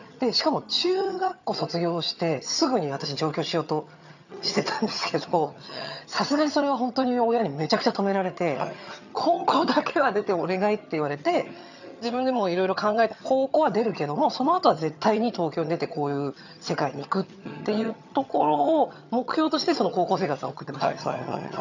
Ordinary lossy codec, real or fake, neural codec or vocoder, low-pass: none; fake; vocoder, 22.05 kHz, 80 mel bands, HiFi-GAN; 7.2 kHz